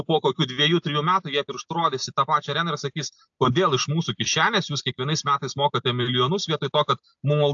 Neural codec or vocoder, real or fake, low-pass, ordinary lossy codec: none; real; 7.2 kHz; AAC, 64 kbps